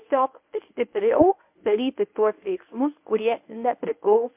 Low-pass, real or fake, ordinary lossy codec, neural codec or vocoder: 3.6 kHz; fake; MP3, 32 kbps; codec, 24 kHz, 0.9 kbps, WavTokenizer, medium speech release version 1